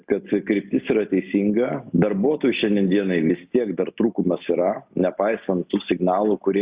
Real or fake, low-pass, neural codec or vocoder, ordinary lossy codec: real; 3.6 kHz; none; Opus, 64 kbps